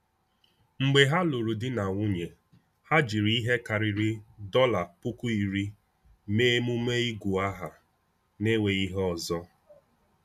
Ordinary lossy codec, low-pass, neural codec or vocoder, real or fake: none; 14.4 kHz; none; real